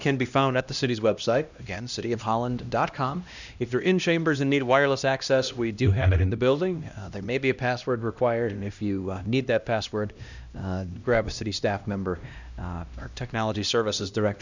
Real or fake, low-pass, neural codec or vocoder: fake; 7.2 kHz; codec, 16 kHz, 1 kbps, X-Codec, HuBERT features, trained on LibriSpeech